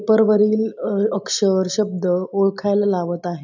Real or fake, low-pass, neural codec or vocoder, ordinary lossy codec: real; 7.2 kHz; none; none